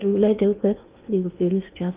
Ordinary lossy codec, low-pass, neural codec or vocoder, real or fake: Opus, 24 kbps; 3.6 kHz; codec, 16 kHz in and 24 kHz out, 0.8 kbps, FocalCodec, streaming, 65536 codes; fake